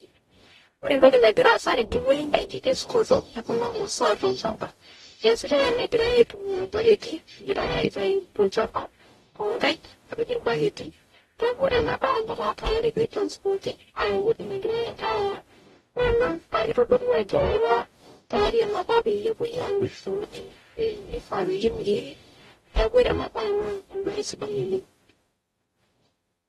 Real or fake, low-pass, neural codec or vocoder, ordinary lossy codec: fake; 19.8 kHz; codec, 44.1 kHz, 0.9 kbps, DAC; AAC, 32 kbps